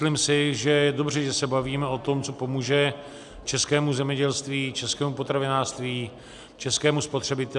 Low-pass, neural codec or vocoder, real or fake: 10.8 kHz; none; real